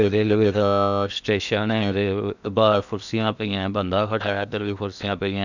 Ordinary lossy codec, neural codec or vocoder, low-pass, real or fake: none; codec, 16 kHz in and 24 kHz out, 0.8 kbps, FocalCodec, streaming, 65536 codes; 7.2 kHz; fake